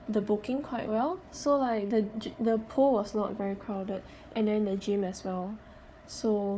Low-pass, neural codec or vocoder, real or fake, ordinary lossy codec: none; codec, 16 kHz, 4 kbps, FunCodec, trained on Chinese and English, 50 frames a second; fake; none